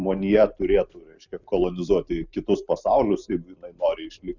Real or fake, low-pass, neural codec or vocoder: real; 7.2 kHz; none